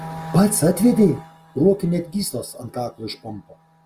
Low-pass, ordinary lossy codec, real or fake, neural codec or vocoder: 14.4 kHz; Opus, 32 kbps; real; none